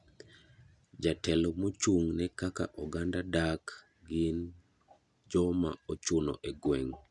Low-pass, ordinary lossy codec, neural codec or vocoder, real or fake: 10.8 kHz; none; none; real